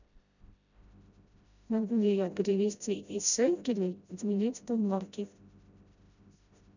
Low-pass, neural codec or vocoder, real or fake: 7.2 kHz; codec, 16 kHz, 0.5 kbps, FreqCodec, smaller model; fake